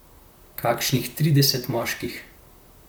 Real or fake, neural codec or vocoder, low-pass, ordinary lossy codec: fake; vocoder, 44.1 kHz, 128 mel bands, Pupu-Vocoder; none; none